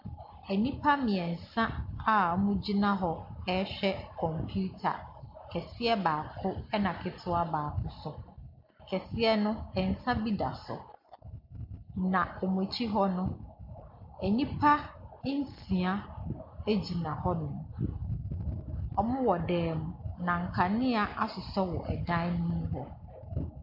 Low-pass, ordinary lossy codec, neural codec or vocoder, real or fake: 5.4 kHz; MP3, 48 kbps; none; real